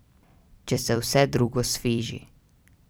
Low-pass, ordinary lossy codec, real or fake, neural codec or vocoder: none; none; fake; vocoder, 44.1 kHz, 128 mel bands every 256 samples, BigVGAN v2